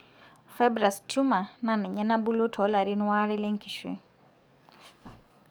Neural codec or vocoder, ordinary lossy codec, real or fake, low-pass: codec, 44.1 kHz, 7.8 kbps, DAC; none; fake; none